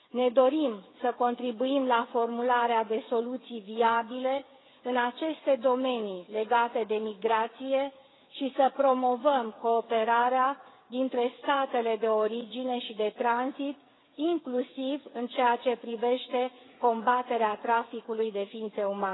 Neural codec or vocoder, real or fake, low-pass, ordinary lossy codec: codec, 16 kHz, 8 kbps, FreqCodec, smaller model; fake; 7.2 kHz; AAC, 16 kbps